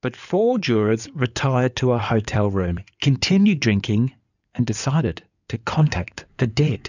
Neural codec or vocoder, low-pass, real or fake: codec, 16 kHz in and 24 kHz out, 2.2 kbps, FireRedTTS-2 codec; 7.2 kHz; fake